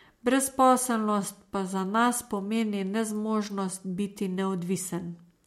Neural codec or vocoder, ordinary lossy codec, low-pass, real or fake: none; MP3, 64 kbps; 19.8 kHz; real